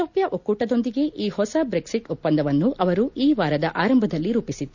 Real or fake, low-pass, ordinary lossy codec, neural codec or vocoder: real; 7.2 kHz; none; none